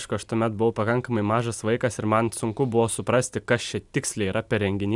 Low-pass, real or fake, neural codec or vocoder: 10.8 kHz; real; none